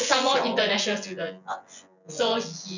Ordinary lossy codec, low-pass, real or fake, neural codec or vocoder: none; 7.2 kHz; fake; vocoder, 24 kHz, 100 mel bands, Vocos